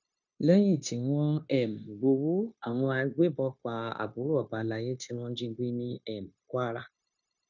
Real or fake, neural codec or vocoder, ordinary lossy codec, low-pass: fake; codec, 16 kHz, 0.9 kbps, LongCat-Audio-Codec; none; 7.2 kHz